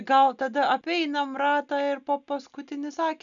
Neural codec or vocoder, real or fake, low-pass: none; real; 7.2 kHz